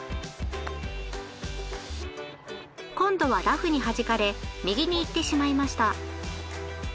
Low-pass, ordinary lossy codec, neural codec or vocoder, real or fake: none; none; none; real